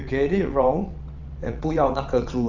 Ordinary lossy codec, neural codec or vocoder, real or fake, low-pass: none; vocoder, 22.05 kHz, 80 mel bands, WaveNeXt; fake; 7.2 kHz